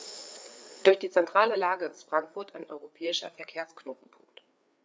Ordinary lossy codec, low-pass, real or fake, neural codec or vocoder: none; none; fake; codec, 16 kHz, 4 kbps, FreqCodec, larger model